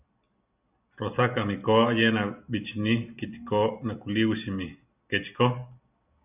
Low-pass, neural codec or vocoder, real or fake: 3.6 kHz; none; real